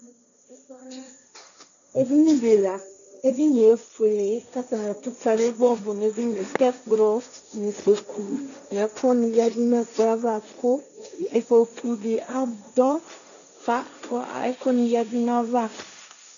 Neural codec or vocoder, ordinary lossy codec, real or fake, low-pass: codec, 16 kHz, 1.1 kbps, Voila-Tokenizer; MP3, 96 kbps; fake; 7.2 kHz